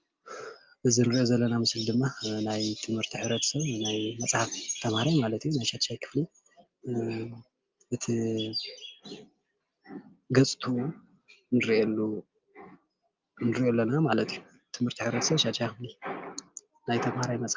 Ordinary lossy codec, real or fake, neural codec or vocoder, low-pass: Opus, 24 kbps; real; none; 7.2 kHz